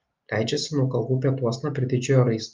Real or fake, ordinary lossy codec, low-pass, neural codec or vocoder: real; Opus, 32 kbps; 7.2 kHz; none